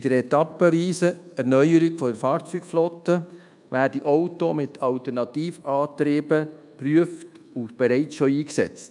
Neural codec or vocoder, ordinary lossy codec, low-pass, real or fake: codec, 24 kHz, 1.2 kbps, DualCodec; none; 10.8 kHz; fake